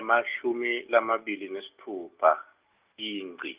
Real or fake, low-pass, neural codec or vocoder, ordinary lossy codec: real; 3.6 kHz; none; Opus, 24 kbps